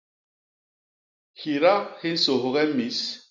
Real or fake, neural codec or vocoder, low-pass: real; none; 7.2 kHz